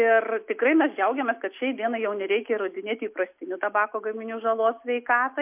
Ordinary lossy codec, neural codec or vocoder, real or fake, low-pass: MP3, 32 kbps; none; real; 3.6 kHz